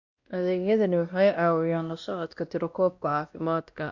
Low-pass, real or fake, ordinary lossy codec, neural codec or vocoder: 7.2 kHz; fake; Opus, 64 kbps; codec, 16 kHz, 1 kbps, X-Codec, WavLM features, trained on Multilingual LibriSpeech